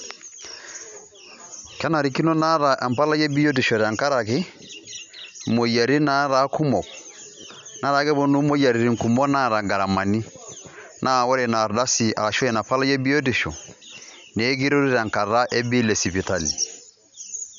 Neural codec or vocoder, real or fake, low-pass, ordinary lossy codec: none; real; 7.2 kHz; none